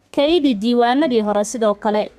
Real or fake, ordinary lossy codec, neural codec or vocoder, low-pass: fake; none; codec, 32 kHz, 1.9 kbps, SNAC; 14.4 kHz